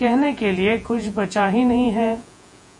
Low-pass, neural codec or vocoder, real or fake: 10.8 kHz; vocoder, 48 kHz, 128 mel bands, Vocos; fake